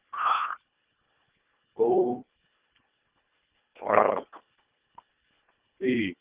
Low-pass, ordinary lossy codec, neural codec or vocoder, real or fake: 3.6 kHz; Opus, 32 kbps; codec, 24 kHz, 1.5 kbps, HILCodec; fake